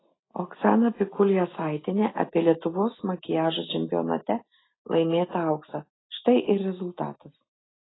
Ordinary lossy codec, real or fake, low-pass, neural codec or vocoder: AAC, 16 kbps; real; 7.2 kHz; none